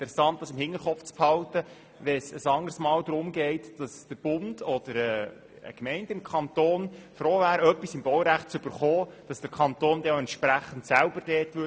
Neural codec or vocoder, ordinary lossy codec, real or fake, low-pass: none; none; real; none